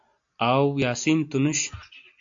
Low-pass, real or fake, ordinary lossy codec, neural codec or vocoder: 7.2 kHz; real; AAC, 64 kbps; none